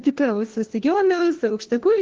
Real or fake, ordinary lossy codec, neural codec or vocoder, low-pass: fake; Opus, 16 kbps; codec, 16 kHz, 1 kbps, FunCodec, trained on LibriTTS, 50 frames a second; 7.2 kHz